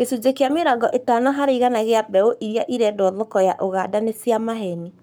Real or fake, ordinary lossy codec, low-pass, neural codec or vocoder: fake; none; none; codec, 44.1 kHz, 7.8 kbps, Pupu-Codec